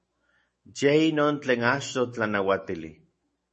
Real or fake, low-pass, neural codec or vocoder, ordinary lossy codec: fake; 10.8 kHz; autoencoder, 48 kHz, 128 numbers a frame, DAC-VAE, trained on Japanese speech; MP3, 32 kbps